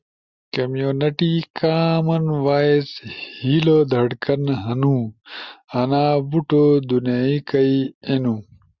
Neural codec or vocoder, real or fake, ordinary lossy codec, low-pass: none; real; Opus, 64 kbps; 7.2 kHz